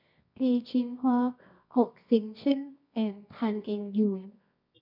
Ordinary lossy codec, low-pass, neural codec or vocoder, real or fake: none; 5.4 kHz; codec, 24 kHz, 0.9 kbps, WavTokenizer, medium music audio release; fake